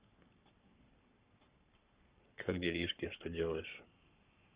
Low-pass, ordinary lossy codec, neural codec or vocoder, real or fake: 3.6 kHz; Opus, 64 kbps; codec, 44.1 kHz, 7.8 kbps, Pupu-Codec; fake